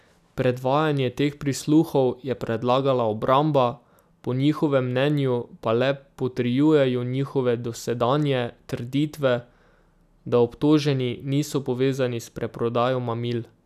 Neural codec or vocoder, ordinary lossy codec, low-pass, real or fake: none; none; 14.4 kHz; real